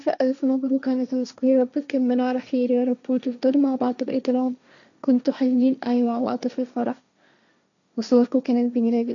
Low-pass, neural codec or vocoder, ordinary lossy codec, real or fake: 7.2 kHz; codec, 16 kHz, 1.1 kbps, Voila-Tokenizer; none; fake